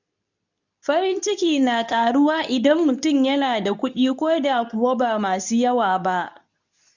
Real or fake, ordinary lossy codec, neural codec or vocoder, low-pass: fake; none; codec, 24 kHz, 0.9 kbps, WavTokenizer, medium speech release version 2; 7.2 kHz